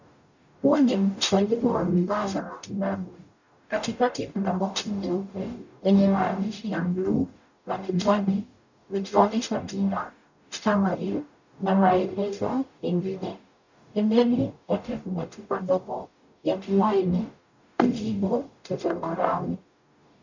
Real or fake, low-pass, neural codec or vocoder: fake; 7.2 kHz; codec, 44.1 kHz, 0.9 kbps, DAC